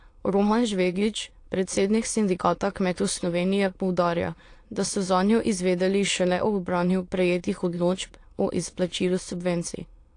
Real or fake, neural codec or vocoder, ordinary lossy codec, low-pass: fake; autoencoder, 22.05 kHz, a latent of 192 numbers a frame, VITS, trained on many speakers; AAC, 48 kbps; 9.9 kHz